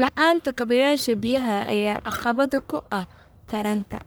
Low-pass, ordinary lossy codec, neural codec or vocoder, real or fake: none; none; codec, 44.1 kHz, 1.7 kbps, Pupu-Codec; fake